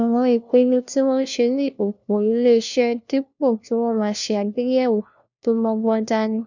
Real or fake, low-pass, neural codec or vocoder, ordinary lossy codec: fake; 7.2 kHz; codec, 16 kHz, 1 kbps, FunCodec, trained on LibriTTS, 50 frames a second; none